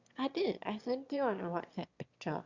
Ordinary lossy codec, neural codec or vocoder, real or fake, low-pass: Opus, 64 kbps; autoencoder, 22.05 kHz, a latent of 192 numbers a frame, VITS, trained on one speaker; fake; 7.2 kHz